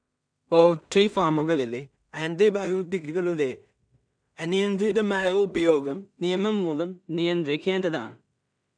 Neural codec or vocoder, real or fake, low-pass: codec, 16 kHz in and 24 kHz out, 0.4 kbps, LongCat-Audio-Codec, two codebook decoder; fake; 9.9 kHz